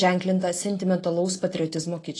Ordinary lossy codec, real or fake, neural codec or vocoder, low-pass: AAC, 32 kbps; real; none; 10.8 kHz